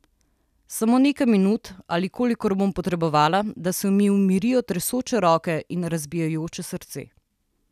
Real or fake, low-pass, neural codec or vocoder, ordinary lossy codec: real; 14.4 kHz; none; none